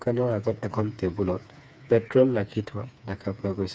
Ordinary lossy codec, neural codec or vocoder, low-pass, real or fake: none; codec, 16 kHz, 4 kbps, FreqCodec, smaller model; none; fake